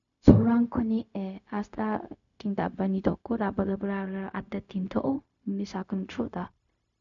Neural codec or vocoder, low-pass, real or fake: codec, 16 kHz, 0.4 kbps, LongCat-Audio-Codec; 7.2 kHz; fake